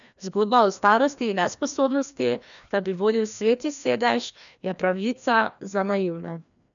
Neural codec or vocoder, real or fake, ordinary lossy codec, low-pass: codec, 16 kHz, 1 kbps, FreqCodec, larger model; fake; none; 7.2 kHz